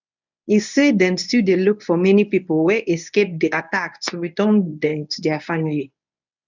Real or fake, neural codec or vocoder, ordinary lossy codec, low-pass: fake; codec, 24 kHz, 0.9 kbps, WavTokenizer, medium speech release version 1; none; 7.2 kHz